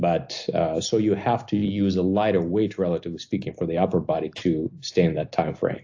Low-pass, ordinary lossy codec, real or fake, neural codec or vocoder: 7.2 kHz; AAC, 48 kbps; real; none